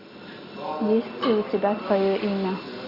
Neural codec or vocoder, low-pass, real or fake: none; 5.4 kHz; real